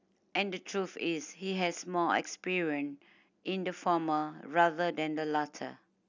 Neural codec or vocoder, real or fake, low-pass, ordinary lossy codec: none; real; 7.2 kHz; none